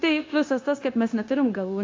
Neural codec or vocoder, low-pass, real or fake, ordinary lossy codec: codec, 16 kHz, 0.9 kbps, LongCat-Audio-Codec; 7.2 kHz; fake; AAC, 32 kbps